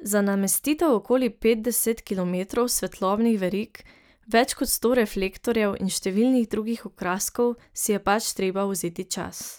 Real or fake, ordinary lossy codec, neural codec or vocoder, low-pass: real; none; none; none